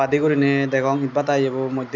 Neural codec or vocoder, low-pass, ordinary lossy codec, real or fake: none; 7.2 kHz; none; real